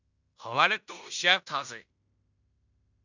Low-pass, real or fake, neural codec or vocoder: 7.2 kHz; fake; codec, 16 kHz in and 24 kHz out, 0.9 kbps, LongCat-Audio-Codec, fine tuned four codebook decoder